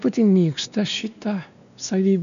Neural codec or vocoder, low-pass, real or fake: codec, 16 kHz, 2 kbps, X-Codec, WavLM features, trained on Multilingual LibriSpeech; 7.2 kHz; fake